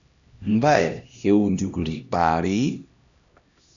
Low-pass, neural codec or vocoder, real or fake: 7.2 kHz; codec, 16 kHz, 1 kbps, X-Codec, HuBERT features, trained on LibriSpeech; fake